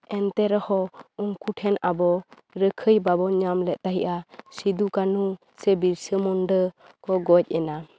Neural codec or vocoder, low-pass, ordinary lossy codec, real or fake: none; none; none; real